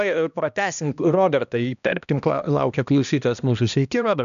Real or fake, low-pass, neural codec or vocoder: fake; 7.2 kHz; codec, 16 kHz, 1 kbps, X-Codec, HuBERT features, trained on balanced general audio